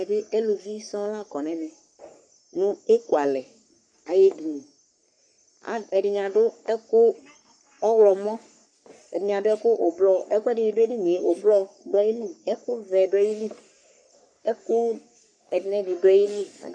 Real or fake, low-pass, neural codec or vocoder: fake; 9.9 kHz; codec, 44.1 kHz, 3.4 kbps, Pupu-Codec